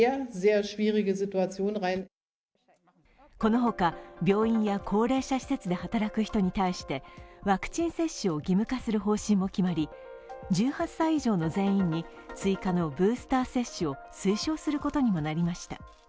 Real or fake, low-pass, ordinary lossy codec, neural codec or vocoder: real; none; none; none